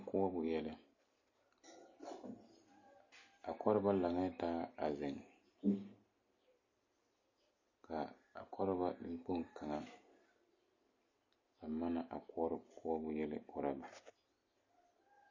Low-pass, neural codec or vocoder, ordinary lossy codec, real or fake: 7.2 kHz; none; MP3, 32 kbps; real